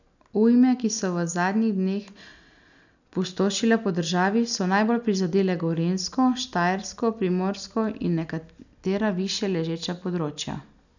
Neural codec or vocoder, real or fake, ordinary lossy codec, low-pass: none; real; none; 7.2 kHz